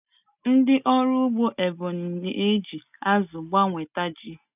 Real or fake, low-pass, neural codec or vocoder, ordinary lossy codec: real; 3.6 kHz; none; none